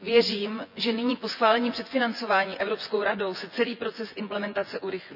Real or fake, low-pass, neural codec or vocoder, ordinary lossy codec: fake; 5.4 kHz; vocoder, 24 kHz, 100 mel bands, Vocos; none